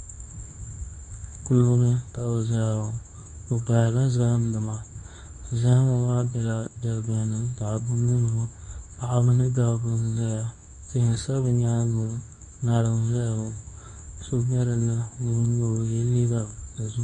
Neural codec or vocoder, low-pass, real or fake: codec, 24 kHz, 0.9 kbps, WavTokenizer, medium speech release version 2; 10.8 kHz; fake